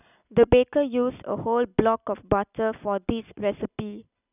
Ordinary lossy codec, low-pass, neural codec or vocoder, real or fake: none; 3.6 kHz; none; real